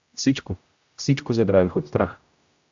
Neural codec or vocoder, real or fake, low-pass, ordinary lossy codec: codec, 16 kHz, 0.5 kbps, X-Codec, HuBERT features, trained on general audio; fake; 7.2 kHz; AAC, 64 kbps